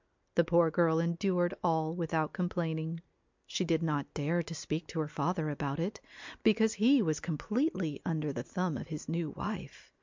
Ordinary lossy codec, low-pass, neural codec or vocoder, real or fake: MP3, 64 kbps; 7.2 kHz; none; real